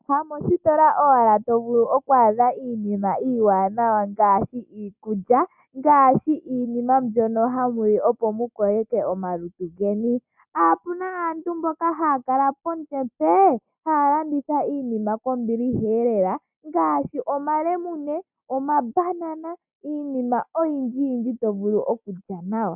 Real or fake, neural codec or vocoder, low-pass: real; none; 3.6 kHz